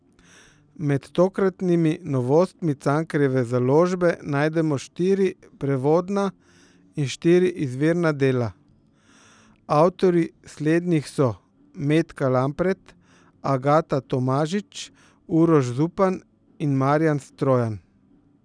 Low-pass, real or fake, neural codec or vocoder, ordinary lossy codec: 9.9 kHz; real; none; none